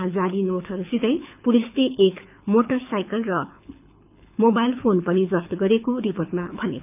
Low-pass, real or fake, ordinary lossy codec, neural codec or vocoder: 3.6 kHz; fake; none; codec, 24 kHz, 6 kbps, HILCodec